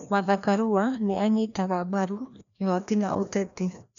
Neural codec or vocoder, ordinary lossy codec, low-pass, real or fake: codec, 16 kHz, 1 kbps, FreqCodec, larger model; none; 7.2 kHz; fake